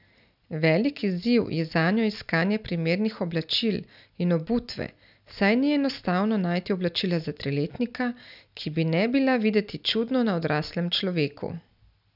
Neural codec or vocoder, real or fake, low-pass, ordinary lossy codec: none; real; 5.4 kHz; none